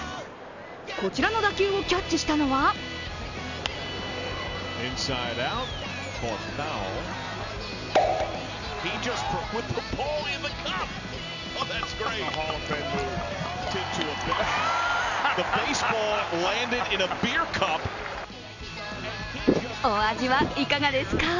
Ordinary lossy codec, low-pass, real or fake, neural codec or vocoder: none; 7.2 kHz; real; none